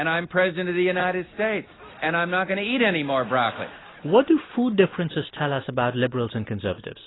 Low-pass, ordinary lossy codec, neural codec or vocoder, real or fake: 7.2 kHz; AAC, 16 kbps; none; real